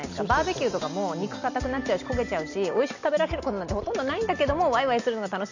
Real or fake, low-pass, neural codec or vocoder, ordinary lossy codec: real; 7.2 kHz; none; none